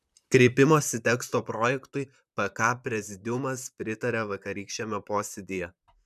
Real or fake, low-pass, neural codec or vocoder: fake; 14.4 kHz; vocoder, 44.1 kHz, 128 mel bands, Pupu-Vocoder